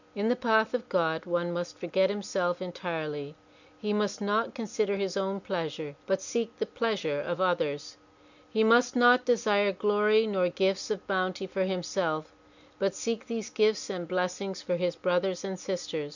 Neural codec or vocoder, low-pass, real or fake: none; 7.2 kHz; real